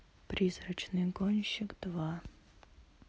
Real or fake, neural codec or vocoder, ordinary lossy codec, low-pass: real; none; none; none